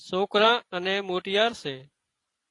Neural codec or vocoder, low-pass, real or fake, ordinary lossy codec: vocoder, 24 kHz, 100 mel bands, Vocos; 10.8 kHz; fake; AAC, 48 kbps